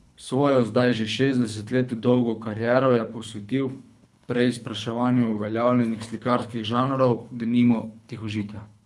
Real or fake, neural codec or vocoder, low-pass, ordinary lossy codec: fake; codec, 24 kHz, 3 kbps, HILCodec; none; none